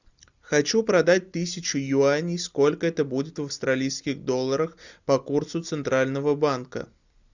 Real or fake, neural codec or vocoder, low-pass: real; none; 7.2 kHz